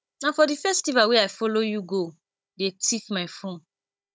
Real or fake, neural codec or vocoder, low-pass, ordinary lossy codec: fake; codec, 16 kHz, 16 kbps, FunCodec, trained on Chinese and English, 50 frames a second; none; none